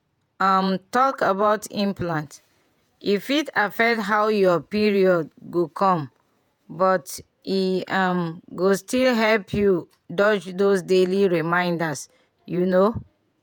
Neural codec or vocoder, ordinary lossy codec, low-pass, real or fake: vocoder, 48 kHz, 128 mel bands, Vocos; none; 19.8 kHz; fake